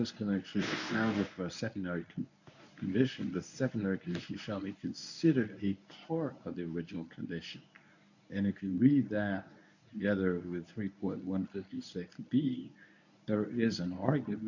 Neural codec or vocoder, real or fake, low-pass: codec, 24 kHz, 0.9 kbps, WavTokenizer, medium speech release version 1; fake; 7.2 kHz